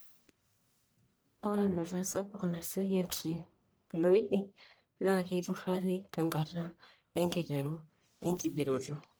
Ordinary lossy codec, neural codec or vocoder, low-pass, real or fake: none; codec, 44.1 kHz, 1.7 kbps, Pupu-Codec; none; fake